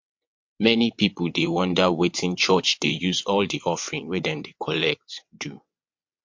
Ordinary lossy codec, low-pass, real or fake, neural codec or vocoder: MP3, 48 kbps; 7.2 kHz; fake; vocoder, 22.05 kHz, 80 mel bands, WaveNeXt